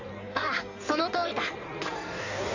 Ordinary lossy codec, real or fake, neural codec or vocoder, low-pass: AAC, 48 kbps; fake; codec, 16 kHz in and 24 kHz out, 1.1 kbps, FireRedTTS-2 codec; 7.2 kHz